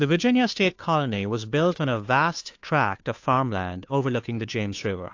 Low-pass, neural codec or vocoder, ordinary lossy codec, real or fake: 7.2 kHz; codec, 16 kHz, 2 kbps, FunCodec, trained on Chinese and English, 25 frames a second; AAC, 48 kbps; fake